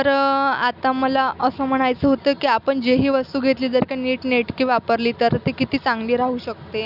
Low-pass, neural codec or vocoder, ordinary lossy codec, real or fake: 5.4 kHz; none; none; real